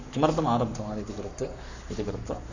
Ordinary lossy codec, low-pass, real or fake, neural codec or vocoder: none; 7.2 kHz; fake; codec, 44.1 kHz, 7.8 kbps, Pupu-Codec